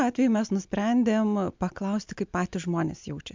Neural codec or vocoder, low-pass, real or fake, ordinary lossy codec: none; 7.2 kHz; real; MP3, 64 kbps